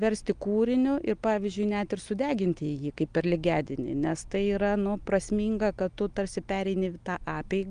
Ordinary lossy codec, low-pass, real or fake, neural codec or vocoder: Opus, 32 kbps; 9.9 kHz; real; none